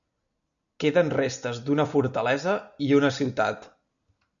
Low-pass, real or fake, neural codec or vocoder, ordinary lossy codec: 7.2 kHz; real; none; MP3, 96 kbps